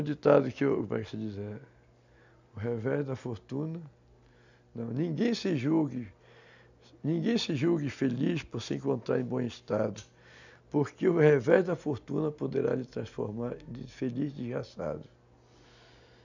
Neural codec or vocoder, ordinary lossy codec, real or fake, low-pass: none; none; real; 7.2 kHz